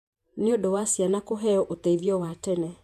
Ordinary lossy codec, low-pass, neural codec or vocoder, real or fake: AAC, 96 kbps; 14.4 kHz; vocoder, 48 kHz, 128 mel bands, Vocos; fake